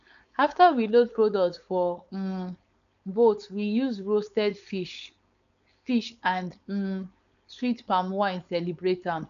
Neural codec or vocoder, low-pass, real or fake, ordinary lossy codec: codec, 16 kHz, 4.8 kbps, FACodec; 7.2 kHz; fake; none